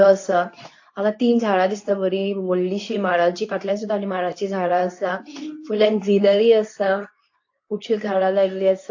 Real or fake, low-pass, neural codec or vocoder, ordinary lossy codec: fake; 7.2 kHz; codec, 24 kHz, 0.9 kbps, WavTokenizer, medium speech release version 2; AAC, 48 kbps